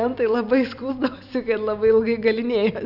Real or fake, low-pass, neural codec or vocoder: fake; 5.4 kHz; vocoder, 44.1 kHz, 128 mel bands every 256 samples, BigVGAN v2